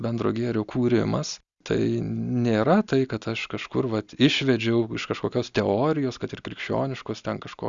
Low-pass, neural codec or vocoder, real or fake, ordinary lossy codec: 7.2 kHz; none; real; Opus, 64 kbps